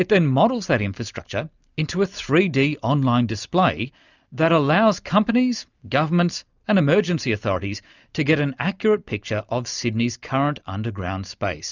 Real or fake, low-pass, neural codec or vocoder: real; 7.2 kHz; none